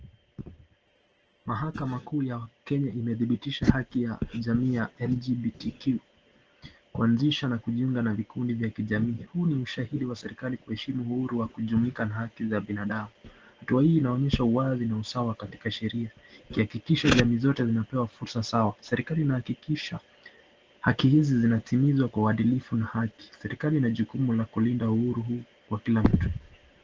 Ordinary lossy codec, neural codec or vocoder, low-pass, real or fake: Opus, 16 kbps; none; 7.2 kHz; real